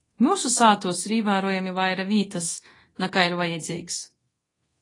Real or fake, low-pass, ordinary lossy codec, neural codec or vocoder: fake; 10.8 kHz; AAC, 32 kbps; codec, 24 kHz, 0.9 kbps, DualCodec